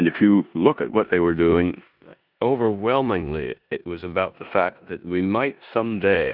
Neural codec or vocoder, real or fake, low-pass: codec, 16 kHz in and 24 kHz out, 0.9 kbps, LongCat-Audio-Codec, four codebook decoder; fake; 5.4 kHz